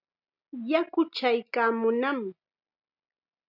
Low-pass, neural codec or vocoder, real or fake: 5.4 kHz; none; real